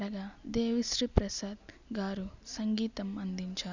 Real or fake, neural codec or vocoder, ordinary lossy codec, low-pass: real; none; none; 7.2 kHz